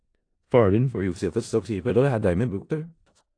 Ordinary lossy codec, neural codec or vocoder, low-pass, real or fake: AAC, 48 kbps; codec, 16 kHz in and 24 kHz out, 0.4 kbps, LongCat-Audio-Codec, four codebook decoder; 9.9 kHz; fake